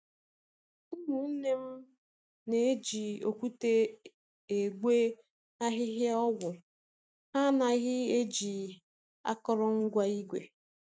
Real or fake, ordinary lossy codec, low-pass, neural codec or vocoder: real; none; none; none